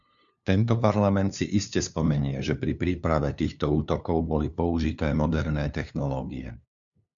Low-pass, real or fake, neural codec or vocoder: 7.2 kHz; fake; codec, 16 kHz, 2 kbps, FunCodec, trained on LibriTTS, 25 frames a second